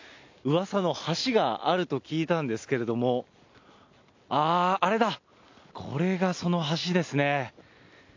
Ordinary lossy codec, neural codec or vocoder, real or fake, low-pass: AAC, 48 kbps; none; real; 7.2 kHz